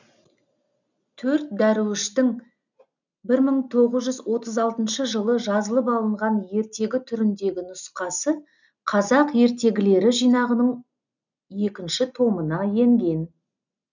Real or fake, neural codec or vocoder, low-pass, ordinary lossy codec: real; none; 7.2 kHz; none